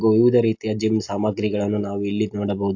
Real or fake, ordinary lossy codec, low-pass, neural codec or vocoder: real; AAC, 48 kbps; 7.2 kHz; none